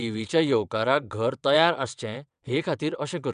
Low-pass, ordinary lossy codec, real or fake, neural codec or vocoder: 9.9 kHz; none; fake; vocoder, 22.05 kHz, 80 mel bands, WaveNeXt